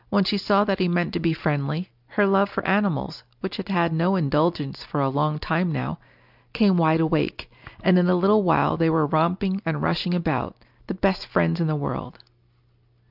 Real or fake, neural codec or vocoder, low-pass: real; none; 5.4 kHz